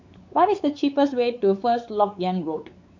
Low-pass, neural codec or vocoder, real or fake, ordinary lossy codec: 7.2 kHz; codec, 16 kHz, 4 kbps, X-Codec, WavLM features, trained on Multilingual LibriSpeech; fake; MP3, 64 kbps